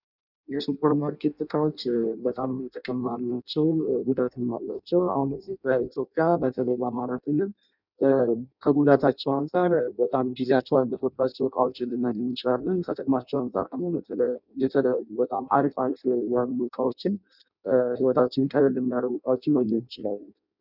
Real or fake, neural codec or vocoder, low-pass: fake; codec, 16 kHz in and 24 kHz out, 0.6 kbps, FireRedTTS-2 codec; 5.4 kHz